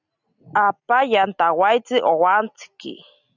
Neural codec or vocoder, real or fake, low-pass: none; real; 7.2 kHz